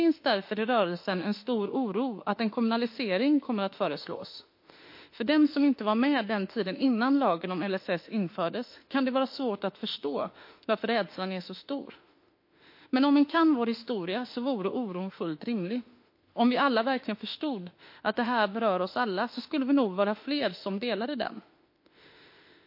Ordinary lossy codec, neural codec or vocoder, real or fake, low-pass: MP3, 32 kbps; autoencoder, 48 kHz, 32 numbers a frame, DAC-VAE, trained on Japanese speech; fake; 5.4 kHz